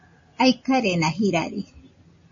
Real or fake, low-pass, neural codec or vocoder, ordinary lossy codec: real; 7.2 kHz; none; MP3, 32 kbps